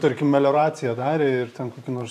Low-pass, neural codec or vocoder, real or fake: 14.4 kHz; vocoder, 44.1 kHz, 128 mel bands every 512 samples, BigVGAN v2; fake